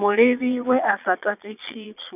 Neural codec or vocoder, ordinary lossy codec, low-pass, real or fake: vocoder, 22.05 kHz, 80 mel bands, Vocos; none; 3.6 kHz; fake